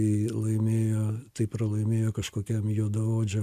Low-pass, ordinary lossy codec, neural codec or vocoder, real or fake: 14.4 kHz; AAC, 96 kbps; none; real